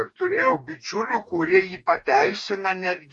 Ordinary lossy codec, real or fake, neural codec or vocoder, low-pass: MP3, 48 kbps; fake; codec, 44.1 kHz, 2.6 kbps, DAC; 10.8 kHz